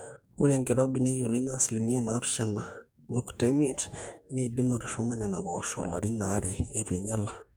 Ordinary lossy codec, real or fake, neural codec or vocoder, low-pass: none; fake; codec, 44.1 kHz, 2.6 kbps, DAC; none